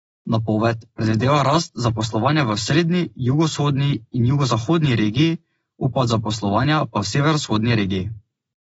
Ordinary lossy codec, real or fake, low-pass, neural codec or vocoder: AAC, 24 kbps; real; 19.8 kHz; none